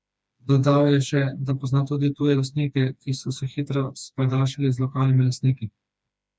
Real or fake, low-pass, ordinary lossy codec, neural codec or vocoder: fake; none; none; codec, 16 kHz, 2 kbps, FreqCodec, smaller model